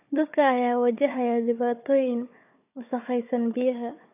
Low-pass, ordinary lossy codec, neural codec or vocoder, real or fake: 3.6 kHz; none; codec, 16 kHz, 4 kbps, FunCodec, trained on Chinese and English, 50 frames a second; fake